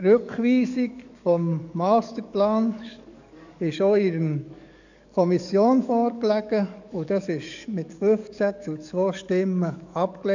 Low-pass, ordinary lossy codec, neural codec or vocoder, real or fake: 7.2 kHz; none; codec, 44.1 kHz, 7.8 kbps, DAC; fake